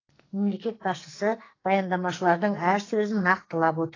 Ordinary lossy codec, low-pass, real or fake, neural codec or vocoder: AAC, 32 kbps; 7.2 kHz; fake; codec, 44.1 kHz, 2.6 kbps, SNAC